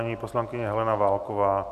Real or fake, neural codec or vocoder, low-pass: real; none; 14.4 kHz